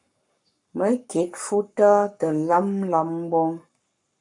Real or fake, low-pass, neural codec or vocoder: fake; 10.8 kHz; codec, 44.1 kHz, 7.8 kbps, Pupu-Codec